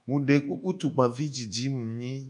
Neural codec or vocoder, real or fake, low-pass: codec, 24 kHz, 1.2 kbps, DualCodec; fake; 10.8 kHz